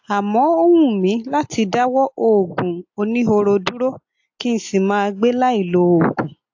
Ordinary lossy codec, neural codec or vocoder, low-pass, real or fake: AAC, 48 kbps; none; 7.2 kHz; real